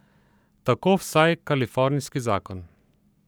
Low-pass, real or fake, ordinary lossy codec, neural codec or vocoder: none; fake; none; codec, 44.1 kHz, 7.8 kbps, Pupu-Codec